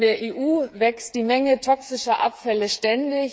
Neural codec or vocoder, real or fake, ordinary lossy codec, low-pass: codec, 16 kHz, 8 kbps, FreqCodec, smaller model; fake; none; none